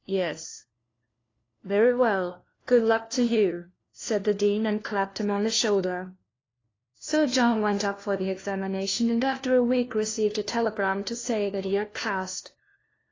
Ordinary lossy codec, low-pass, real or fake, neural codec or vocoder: AAC, 32 kbps; 7.2 kHz; fake; codec, 16 kHz, 1 kbps, FunCodec, trained on LibriTTS, 50 frames a second